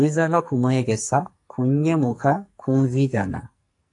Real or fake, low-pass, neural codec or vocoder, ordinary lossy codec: fake; 10.8 kHz; codec, 44.1 kHz, 2.6 kbps, SNAC; AAC, 64 kbps